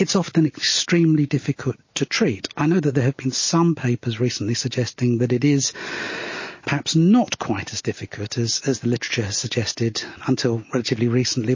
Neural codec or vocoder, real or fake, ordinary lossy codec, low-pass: none; real; MP3, 32 kbps; 7.2 kHz